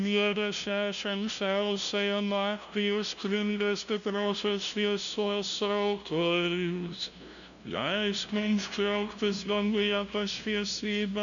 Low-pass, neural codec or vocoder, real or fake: 7.2 kHz; codec, 16 kHz, 0.5 kbps, FunCodec, trained on LibriTTS, 25 frames a second; fake